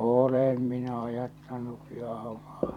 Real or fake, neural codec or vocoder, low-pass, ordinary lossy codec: fake; vocoder, 44.1 kHz, 128 mel bands every 512 samples, BigVGAN v2; 19.8 kHz; none